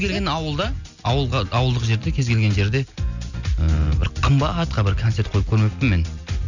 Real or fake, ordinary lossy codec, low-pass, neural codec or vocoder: real; none; 7.2 kHz; none